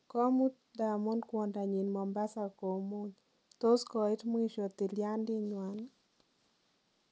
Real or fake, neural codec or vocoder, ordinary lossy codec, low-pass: real; none; none; none